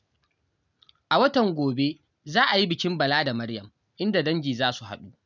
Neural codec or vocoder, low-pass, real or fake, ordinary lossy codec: none; 7.2 kHz; real; none